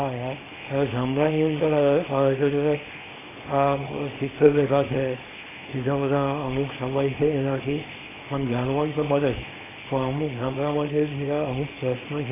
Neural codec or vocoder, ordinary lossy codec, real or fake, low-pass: codec, 24 kHz, 0.9 kbps, WavTokenizer, small release; AAC, 16 kbps; fake; 3.6 kHz